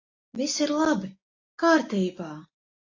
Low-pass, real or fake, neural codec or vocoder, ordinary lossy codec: 7.2 kHz; real; none; AAC, 32 kbps